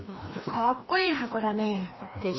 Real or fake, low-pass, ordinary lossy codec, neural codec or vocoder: fake; 7.2 kHz; MP3, 24 kbps; codec, 16 kHz, 1 kbps, FreqCodec, larger model